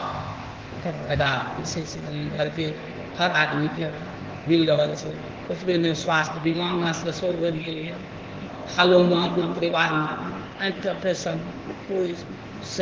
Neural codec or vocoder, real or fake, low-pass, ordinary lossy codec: codec, 16 kHz, 0.8 kbps, ZipCodec; fake; 7.2 kHz; Opus, 16 kbps